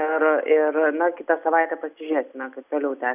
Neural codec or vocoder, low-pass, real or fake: none; 3.6 kHz; real